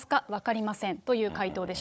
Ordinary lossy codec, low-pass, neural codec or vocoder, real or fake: none; none; codec, 16 kHz, 16 kbps, FunCodec, trained on Chinese and English, 50 frames a second; fake